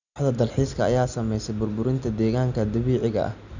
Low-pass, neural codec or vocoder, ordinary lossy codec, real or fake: 7.2 kHz; none; none; real